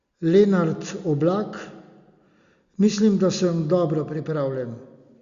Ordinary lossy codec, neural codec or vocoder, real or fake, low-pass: Opus, 64 kbps; none; real; 7.2 kHz